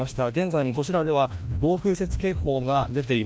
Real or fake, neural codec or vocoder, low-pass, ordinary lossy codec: fake; codec, 16 kHz, 1 kbps, FreqCodec, larger model; none; none